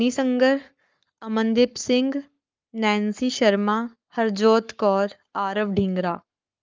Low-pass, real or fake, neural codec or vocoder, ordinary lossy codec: 7.2 kHz; fake; codec, 44.1 kHz, 7.8 kbps, Pupu-Codec; Opus, 32 kbps